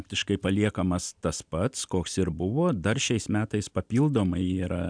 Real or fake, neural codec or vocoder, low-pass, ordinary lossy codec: real; none; 9.9 kHz; MP3, 96 kbps